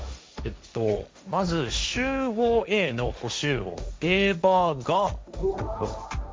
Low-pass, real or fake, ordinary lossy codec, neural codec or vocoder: none; fake; none; codec, 16 kHz, 1.1 kbps, Voila-Tokenizer